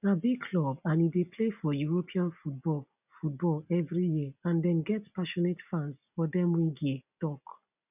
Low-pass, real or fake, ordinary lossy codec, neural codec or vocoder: 3.6 kHz; real; none; none